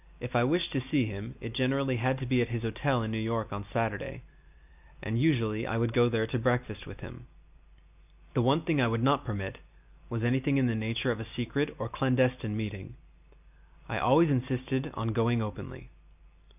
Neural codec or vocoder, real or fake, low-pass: none; real; 3.6 kHz